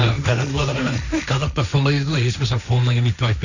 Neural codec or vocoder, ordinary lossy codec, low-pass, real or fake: codec, 16 kHz, 1.1 kbps, Voila-Tokenizer; none; 7.2 kHz; fake